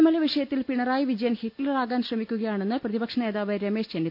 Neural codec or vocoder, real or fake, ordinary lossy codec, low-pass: none; real; none; 5.4 kHz